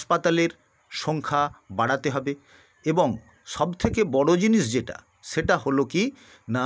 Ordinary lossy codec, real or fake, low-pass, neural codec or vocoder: none; real; none; none